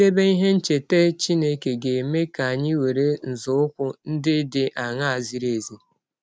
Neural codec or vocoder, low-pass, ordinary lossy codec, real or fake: none; none; none; real